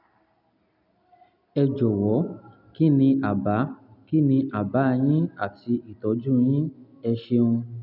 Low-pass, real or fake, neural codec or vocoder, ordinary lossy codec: 5.4 kHz; real; none; none